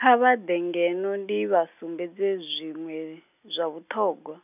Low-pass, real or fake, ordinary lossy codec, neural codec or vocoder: 3.6 kHz; real; none; none